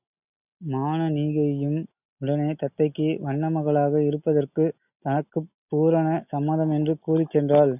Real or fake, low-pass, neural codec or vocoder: real; 3.6 kHz; none